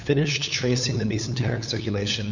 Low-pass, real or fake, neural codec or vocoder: 7.2 kHz; fake; codec, 16 kHz, 8 kbps, FunCodec, trained on LibriTTS, 25 frames a second